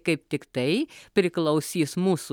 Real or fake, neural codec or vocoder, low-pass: real; none; 19.8 kHz